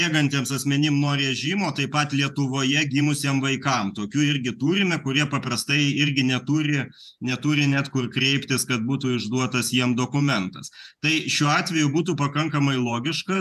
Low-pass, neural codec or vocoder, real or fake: 14.4 kHz; vocoder, 48 kHz, 128 mel bands, Vocos; fake